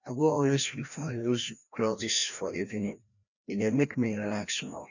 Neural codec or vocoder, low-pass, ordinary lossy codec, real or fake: codec, 16 kHz, 1 kbps, FreqCodec, larger model; 7.2 kHz; none; fake